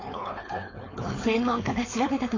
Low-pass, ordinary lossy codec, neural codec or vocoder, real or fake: 7.2 kHz; AAC, 32 kbps; codec, 16 kHz, 4.8 kbps, FACodec; fake